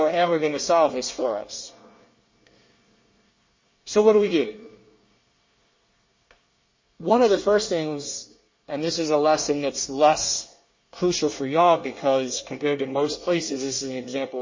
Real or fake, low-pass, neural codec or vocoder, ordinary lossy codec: fake; 7.2 kHz; codec, 24 kHz, 1 kbps, SNAC; MP3, 32 kbps